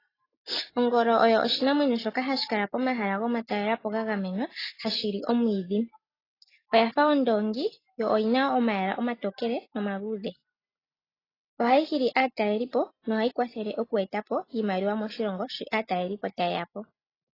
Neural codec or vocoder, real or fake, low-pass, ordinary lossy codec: none; real; 5.4 kHz; AAC, 24 kbps